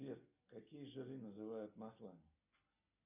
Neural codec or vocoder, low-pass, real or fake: none; 3.6 kHz; real